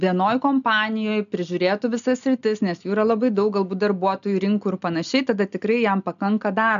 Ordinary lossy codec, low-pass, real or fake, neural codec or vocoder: AAC, 96 kbps; 7.2 kHz; real; none